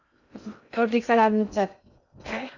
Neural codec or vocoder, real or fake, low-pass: codec, 16 kHz in and 24 kHz out, 0.6 kbps, FocalCodec, streaming, 2048 codes; fake; 7.2 kHz